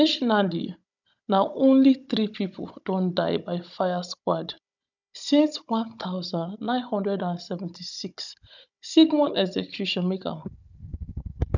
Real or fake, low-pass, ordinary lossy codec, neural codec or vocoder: fake; 7.2 kHz; none; codec, 16 kHz, 16 kbps, FunCodec, trained on Chinese and English, 50 frames a second